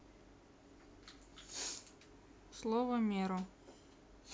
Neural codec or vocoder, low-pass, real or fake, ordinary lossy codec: none; none; real; none